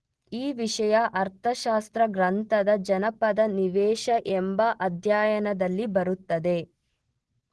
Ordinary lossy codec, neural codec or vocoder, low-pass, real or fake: Opus, 16 kbps; none; 10.8 kHz; real